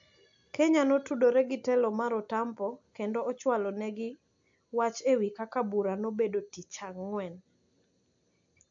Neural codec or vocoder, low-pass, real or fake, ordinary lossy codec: none; 7.2 kHz; real; AAC, 64 kbps